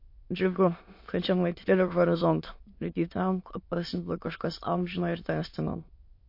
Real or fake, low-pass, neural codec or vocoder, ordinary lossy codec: fake; 5.4 kHz; autoencoder, 22.05 kHz, a latent of 192 numbers a frame, VITS, trained on many speakers; MP3, 32 kbps